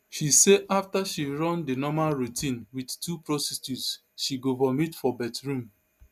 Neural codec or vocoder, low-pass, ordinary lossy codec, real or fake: none; 14.4 kHz; none; real